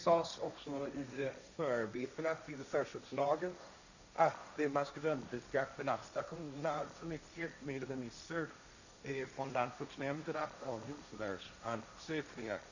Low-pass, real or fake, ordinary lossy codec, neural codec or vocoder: 7.2 kHz; fake; none; codec, 16 kHz, 1.1 kbps, Voila-Tokenizer